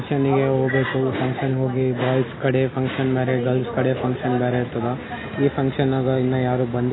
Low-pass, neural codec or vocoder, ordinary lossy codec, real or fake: 7.2 kHz; none; AAC, 16 kbps; real